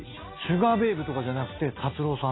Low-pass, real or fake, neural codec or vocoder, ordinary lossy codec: 7.2 kHz; real; none; AAC, 16 kbps